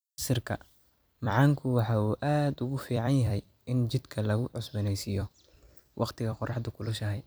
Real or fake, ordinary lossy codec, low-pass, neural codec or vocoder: fake; none; none; vocoder, 44.1 kHz, 128 mel bands every 256 samples, BigVGAN v2